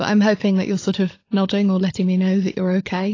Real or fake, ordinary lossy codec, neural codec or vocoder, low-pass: fake; AAC, 32 kbps; codec, 16 kHz, 16 kbps, FunCodec, trained on LibriTTS, 50 frames a second; 7.2 kHz